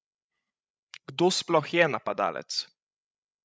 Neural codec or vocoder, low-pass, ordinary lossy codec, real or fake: codec, 16 kHz, 16 kbps, FreqCodec, larger model; none; none; fake